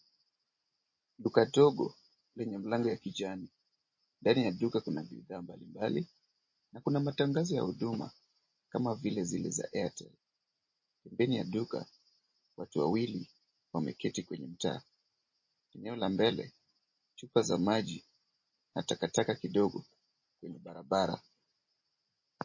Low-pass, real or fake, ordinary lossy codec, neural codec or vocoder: 7.2 kHz; real; MP3, 32 kbps; none